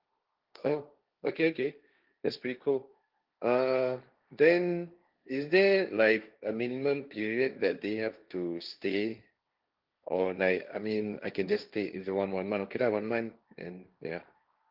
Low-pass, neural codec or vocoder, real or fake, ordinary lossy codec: 5.4 kHz; codec, 16 kHz, 1.1 kbps, Voila-Tokenizer; fake; Opus, 32 kbps